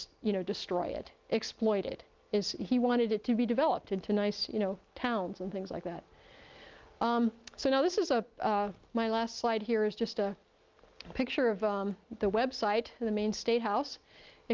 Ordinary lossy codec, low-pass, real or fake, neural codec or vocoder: Opus, 24 kbps; 7.2 kHz; real; none